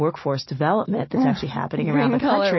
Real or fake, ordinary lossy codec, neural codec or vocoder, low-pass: real; MP3, 24 kbps; none; 7.2 kHz